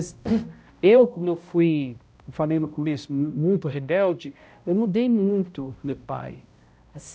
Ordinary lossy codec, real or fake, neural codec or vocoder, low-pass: none; fake; codec, 16 kHz, 0.5 kbps, X-Codec, HuBERT features, trained on balanced general audio; none